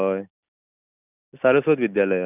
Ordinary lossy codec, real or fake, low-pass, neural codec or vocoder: none; real; 3.6 kHz; none